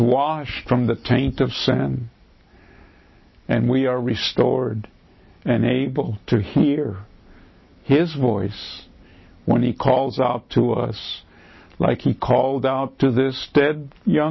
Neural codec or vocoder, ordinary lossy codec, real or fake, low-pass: none; MP3, 24 kbps; real; 7.2 kHz